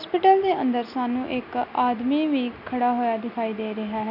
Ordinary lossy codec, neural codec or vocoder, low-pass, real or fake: none; none; 5.4 kHz; real